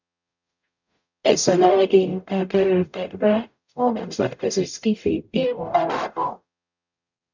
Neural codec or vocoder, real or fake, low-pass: codec, 44.1 kHz, 0.9 kbps, DAC; fake; 7.2 kHz